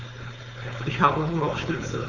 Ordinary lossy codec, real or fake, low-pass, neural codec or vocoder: none; fake; 7.2 kHz; codec, 16 kHz, 4.8 kbps, FACodec